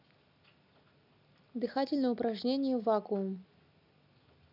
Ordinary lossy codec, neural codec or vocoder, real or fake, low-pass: none; none; real; 5.4 kHz